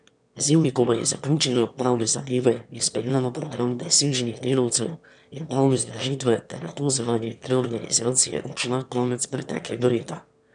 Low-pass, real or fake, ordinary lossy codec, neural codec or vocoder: 9.9 kHz; fake; none; autoencoder, 22.05 kHz, a latent of 192 numbers a frame, VITS, trained on one speaker